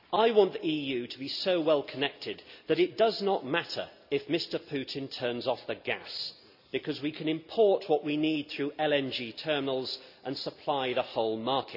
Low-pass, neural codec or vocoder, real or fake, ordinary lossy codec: 5.4 kHz; none; real; none